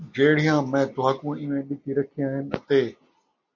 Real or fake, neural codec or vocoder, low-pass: real; none; 7.2 kHz